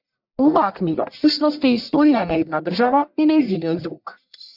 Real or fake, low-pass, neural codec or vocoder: fake; 5.4 kHz; codec, 44.1 kHz, 1.7 kbps, Pupu-Codec